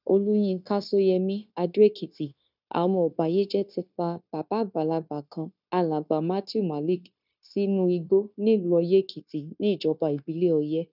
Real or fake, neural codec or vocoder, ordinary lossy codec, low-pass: fake; codec, 16 kHz, 0.9 kbps, LongCat-Audio-Codec; none; 5.4 kHz